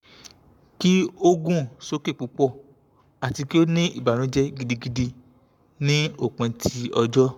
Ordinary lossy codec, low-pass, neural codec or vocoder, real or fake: none; 19.8 kHz; codec, 44.1 kHz, 7.8 kbps, Pupu-Codec; fake